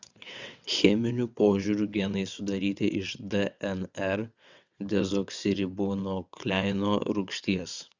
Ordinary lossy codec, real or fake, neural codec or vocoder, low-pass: Opus, 64 kbps; fake; vocoder, 22.05 kHz, 80 mel bands, WaveNeXt; 7.2 kHz